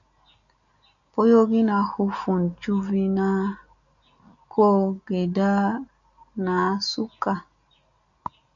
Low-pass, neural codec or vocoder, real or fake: 7.2 kHz; none; real